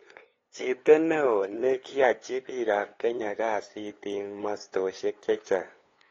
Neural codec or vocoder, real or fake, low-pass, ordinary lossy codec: codec, 16 kHz, 2 kbps, FunCodec, trained on LibriTTS, 25 frames a second; fake; 7.2 kHz; AAC, 32 kbps